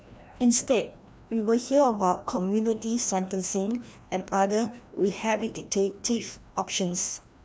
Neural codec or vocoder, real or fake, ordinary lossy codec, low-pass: codec, 16 kHz, 1 kbps, FreqCodec, larger model; fake; none; none